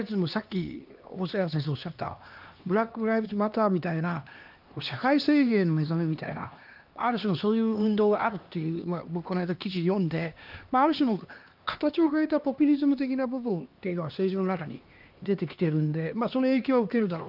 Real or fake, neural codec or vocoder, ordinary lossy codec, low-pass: fake; codec, 16 kHz, 2 kbps, X-Codec, HuBERT features, trained on LibriSpeech; Opus, 24 kbps; 5.4 kHz